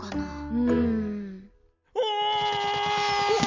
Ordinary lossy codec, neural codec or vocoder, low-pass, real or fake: MP3, 48 kbps; none; 7.2 kHz; real